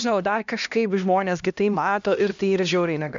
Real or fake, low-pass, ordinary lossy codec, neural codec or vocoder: fake; 7.2 kHz; AAC, 96 kbps; codec, 16 kHz, 1 kbps, X-Codec, HuBERT features, trained on LibriSpeech